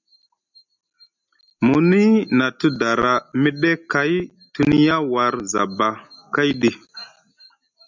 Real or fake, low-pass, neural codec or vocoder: real; 7.2 kHz; none